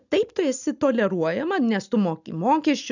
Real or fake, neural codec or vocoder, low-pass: real; none; 7.2 kHz